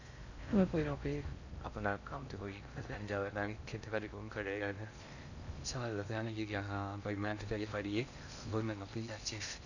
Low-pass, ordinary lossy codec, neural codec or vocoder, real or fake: 7.2 kHz; none; codec, 16 kHz in and 24 kHz out, 0.6 kbps, FocalCodec, streaming, 2048 codes; fake